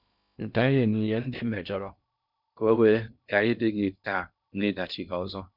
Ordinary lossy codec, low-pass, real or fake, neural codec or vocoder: none; 5.4 kHz; fake; codec, 16 kHz in and 24 kHz out, 0.6 kbps, FocalCodec, streaming, 2048 codes